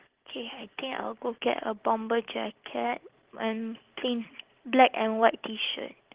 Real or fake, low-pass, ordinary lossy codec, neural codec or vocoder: real; 3.6 kHz; Opus, 16 kbps; none